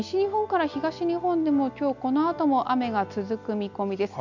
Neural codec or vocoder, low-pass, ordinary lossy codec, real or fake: none; 7.2 kHz; none; real